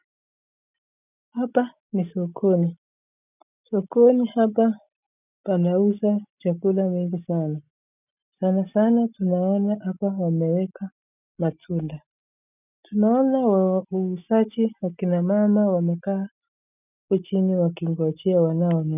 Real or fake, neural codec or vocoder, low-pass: real; none; 3.6 kHz